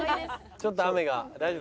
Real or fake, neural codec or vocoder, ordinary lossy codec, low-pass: real; none; none; none